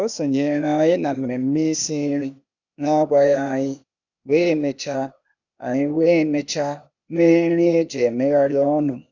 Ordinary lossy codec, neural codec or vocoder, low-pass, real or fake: none; codec, 16 kHz, 0.8 kbps, ZipCodec; 7.2 kHz; fake